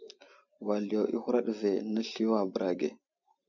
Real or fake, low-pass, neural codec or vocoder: real; 7.2 kHz; none